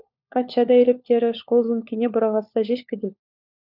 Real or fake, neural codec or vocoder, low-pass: fake; codec, 16 kHz, 4 kbps, FunCodec, trained on LibriTTS, 50 frames a second; 5.4 kHz